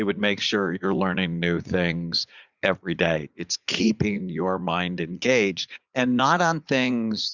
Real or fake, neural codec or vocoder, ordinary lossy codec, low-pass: fake; vocoder, 44.1 kHz, 80 mel bands, Vocos; Opus, 64 kbps; 7.2 kHz